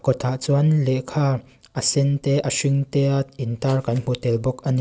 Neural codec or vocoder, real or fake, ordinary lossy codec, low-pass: none; real; none; none